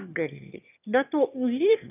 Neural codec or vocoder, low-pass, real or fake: autoencoder, 22.05 kHz, a latent of 192 numbers a frame, VITS, trained on one speaker; 3.6 kHz; fake